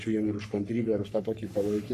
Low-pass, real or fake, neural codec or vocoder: 14.4 kHz; fake; codec, 44.1 kHz, 3.4 kbps, Pupu-Codec